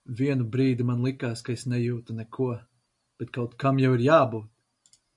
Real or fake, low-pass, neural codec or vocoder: real; 10.8 kHz; none